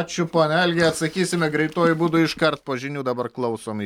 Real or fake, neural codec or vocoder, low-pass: real; none; 19.8 kHz